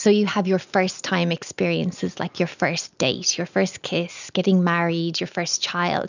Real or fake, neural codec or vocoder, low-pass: real; none; 7.2 kHz